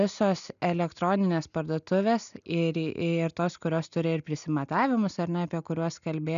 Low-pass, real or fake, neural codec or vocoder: 7.2 kHz; real; none